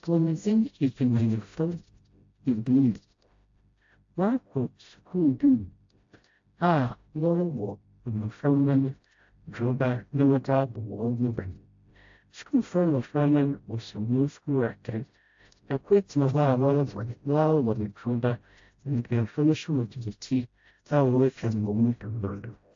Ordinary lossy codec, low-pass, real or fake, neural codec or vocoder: AAC, 48 kbps; 7.2 kHz; fake; codec, 16 kHz, 0.5 kbps, FreqCodec, smaller model